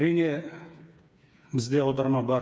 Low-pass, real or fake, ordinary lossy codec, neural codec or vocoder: none; fake; none; codec, 16 kHz, 4 kbps, FreqCodec, smaller model